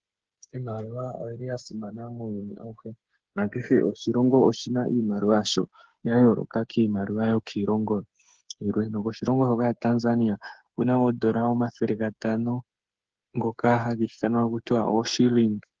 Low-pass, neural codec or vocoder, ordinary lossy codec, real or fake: 7.2 kHz; codec, 16 kHz, 8 kbps, FreqCodec, smaller model; Opus, 16 kbps; fake